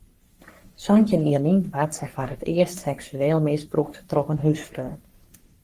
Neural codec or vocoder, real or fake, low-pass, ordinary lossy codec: codec, 44.1 kHz, 3.4 kbps, Pupu-Codec; fake; 14.4 kHz; Opus, 24 kbps